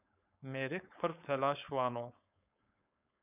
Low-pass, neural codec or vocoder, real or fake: 3.6 kHz; codec, 16 kHz, 4.8 kbps, FACodec; fake